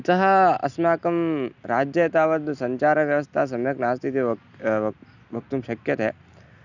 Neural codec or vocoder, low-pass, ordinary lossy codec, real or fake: none; 7.2 kHz; none; real